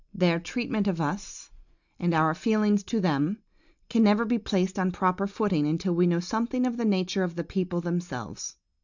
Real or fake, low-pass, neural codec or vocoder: real; 7.2 kHz; none